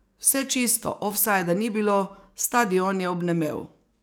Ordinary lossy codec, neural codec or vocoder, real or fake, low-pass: none; codec, 44.1 kHz, 7.8 kbps, DAC; fake; none